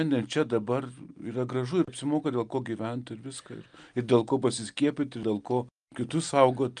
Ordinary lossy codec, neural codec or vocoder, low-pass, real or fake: Opus, 32 kbps; none; 9.9 kHz; real